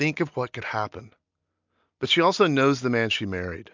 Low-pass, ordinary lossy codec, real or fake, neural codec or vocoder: 7.2 kHz; MP3, 64 kbps; real; none